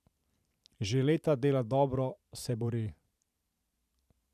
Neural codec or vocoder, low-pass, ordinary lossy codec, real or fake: vocoder, 44.1 kHz, 128 mel bands every 512 samples, BigVGAN v2; 14.4 kHz; none; fake